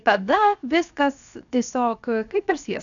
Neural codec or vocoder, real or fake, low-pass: codec, 16 kHz, 0.7 kbps, FocalCodec; fake; 7.2 kHz